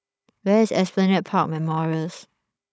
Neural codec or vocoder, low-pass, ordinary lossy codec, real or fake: codec, 16 kHz, 16 kbps, FunCodec, trained on Chinese and English, 50 frames a second; none; none; fake